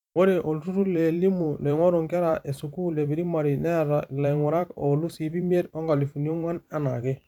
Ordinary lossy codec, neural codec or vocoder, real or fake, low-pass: none; vocoder, 44.1 kHz, 128 mel bands every 512 samples, BigVGAN v2; fake; 19.8 kHz